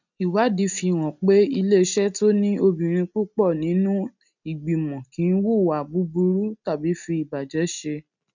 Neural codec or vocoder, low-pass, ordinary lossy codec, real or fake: none; 7.2 kHz; none; real